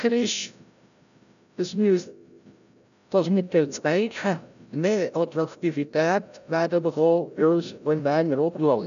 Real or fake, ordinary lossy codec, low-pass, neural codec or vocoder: fake; none; 7.2 kHz; codec, 16 kHz, 0.5 kbps, FreqCodec, larger model